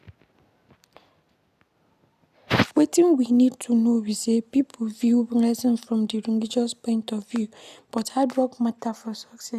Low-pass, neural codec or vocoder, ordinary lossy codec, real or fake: 14.4 kHz; none; none; real